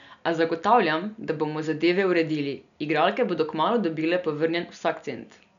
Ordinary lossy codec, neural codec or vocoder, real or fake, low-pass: none; none; real; 7.2 kHz